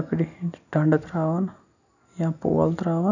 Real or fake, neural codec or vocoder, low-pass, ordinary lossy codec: real; none; 7.2 kHz; none